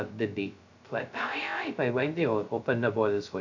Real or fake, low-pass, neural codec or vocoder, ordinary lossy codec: fake; 7.2 kHz; codec, 16 kHz, 0.2 kbps, FocalCodec; none